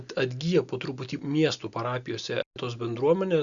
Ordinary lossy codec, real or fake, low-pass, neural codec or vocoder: Opus, 64 kbps; real; 7.2 kHz; none